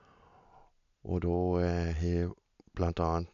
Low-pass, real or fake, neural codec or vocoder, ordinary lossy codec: 7.2 kHz; real; none; none